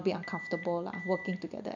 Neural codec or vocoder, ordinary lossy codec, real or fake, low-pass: none; none; real; 7.2 kHz